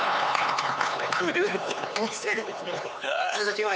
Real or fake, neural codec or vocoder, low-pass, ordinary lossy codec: fake; codec, 16 kHz, 4 kbps, X-Codec, HuBERT features, trained on LibriSpeech; none; none